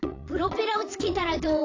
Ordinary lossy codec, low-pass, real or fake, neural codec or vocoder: AAC, 32 kbps; 7.2 kHz; fake; vocoder, 22.05 kHz, 80 mel bands, Vocos